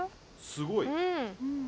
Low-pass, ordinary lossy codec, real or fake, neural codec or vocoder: none; none; real; none